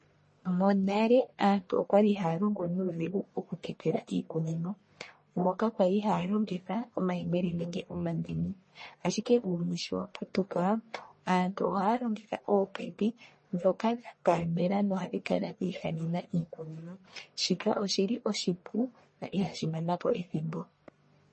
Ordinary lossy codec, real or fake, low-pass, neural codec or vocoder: MP3, 32 kbps; fake; 10.8 kHz; codec, 44.1 kHz, 1.7 kbps, Pupu-Codec